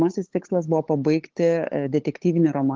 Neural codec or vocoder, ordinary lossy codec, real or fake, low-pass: codec, 16 kHz, 8 kbps, FunCodec, trained on LibriTTS, 25 frames a second; Opus, 16 kbps; fake; 7.2 kHz